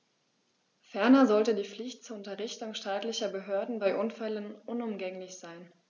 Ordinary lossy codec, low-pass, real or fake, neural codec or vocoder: none; 7.2 kHz; real; none